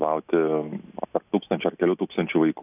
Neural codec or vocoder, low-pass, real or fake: none; 3.6 kHz; real